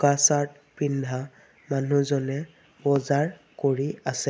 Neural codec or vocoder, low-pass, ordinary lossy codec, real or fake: none; none; none; real